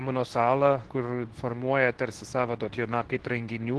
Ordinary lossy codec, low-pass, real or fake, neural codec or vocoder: Opus, 16 kbps; 10.8 kHz; fake; codec, 24 kHz, 0.9 kbps, WavTokenizer, medium speech release version 2